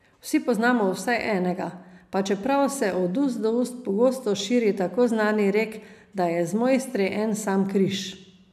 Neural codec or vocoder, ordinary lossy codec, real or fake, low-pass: none; none; real; 14.4 kHz